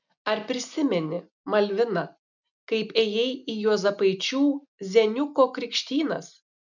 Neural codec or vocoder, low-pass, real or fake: none; 7.2 kHz; real